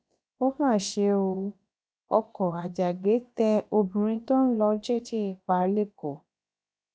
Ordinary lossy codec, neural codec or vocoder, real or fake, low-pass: none; codec, 16 kHz, about 1 kbps, DyCAST, with the encoder's durations; fake; none